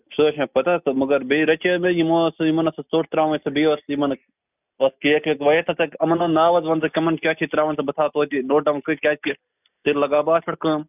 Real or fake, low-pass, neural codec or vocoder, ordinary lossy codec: real; 3.6 kHz; none; none